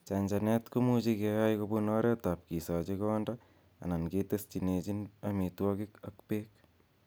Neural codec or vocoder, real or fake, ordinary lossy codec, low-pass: none; real; none; none